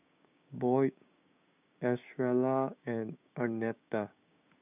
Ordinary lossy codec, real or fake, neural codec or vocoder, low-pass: none; fake; codec, 16 kHz, 6 kbps, DAC; 3.6 kHz